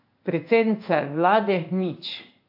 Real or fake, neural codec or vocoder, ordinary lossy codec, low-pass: fake; codec, 16 kHz in and 24 kHz out, 1 kbps, XY-Tokenizer; AAC, 48 kbps; 5.4 kHz